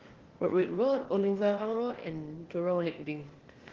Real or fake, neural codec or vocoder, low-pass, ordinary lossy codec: fake; codec, 16 kHz, 0.8 kbps, ZipCodec; 7.2 kHz; Opus, 16 kbps